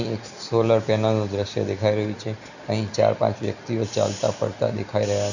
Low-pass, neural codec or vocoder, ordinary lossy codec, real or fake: 7.2 kHz; none; none; real